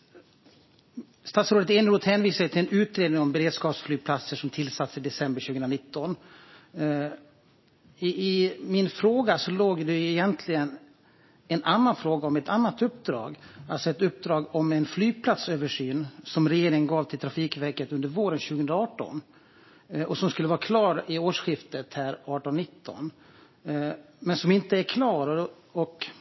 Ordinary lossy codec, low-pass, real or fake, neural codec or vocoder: MP3, 24 kbps; 7.2 kHz; real; none